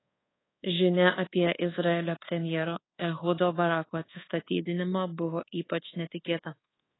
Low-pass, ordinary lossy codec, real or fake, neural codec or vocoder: 7.2 kHz; AAC, 16 kbps; fake; codec, 24 kHz, 1.2 kbps, DualCodec